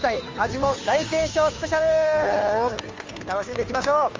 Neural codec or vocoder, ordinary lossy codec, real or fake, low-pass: codec, 16 kHz, 2 kbps, FunCodec, trained on Chinese and English, 25 frames a second; Opus, 32 kbps; fake; 7.2 kHz